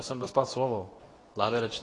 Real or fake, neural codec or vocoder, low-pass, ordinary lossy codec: fake; codec, 24 kHz, 0.9 kbps, WavTokenizer, medium speech release version 2; 10.8 kHz; AAC, 32 kbps